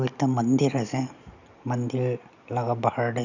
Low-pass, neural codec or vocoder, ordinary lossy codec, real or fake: 7.2 kHz; none; none; real